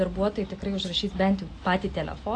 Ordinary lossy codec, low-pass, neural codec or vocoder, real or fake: AAC, 48 kbps; 9.9 kHz; none; real